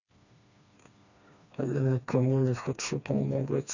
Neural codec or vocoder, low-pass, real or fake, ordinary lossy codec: codec, 16 kHz, 2 kbps, FreqCodec, smaller model; 7.2 kHz; fake; none